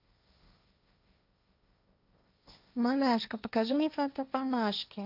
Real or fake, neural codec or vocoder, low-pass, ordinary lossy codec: fake; codec, 16 kHz, 1.1 kbps, Voila-Tokenizer; 5.4 kHz; none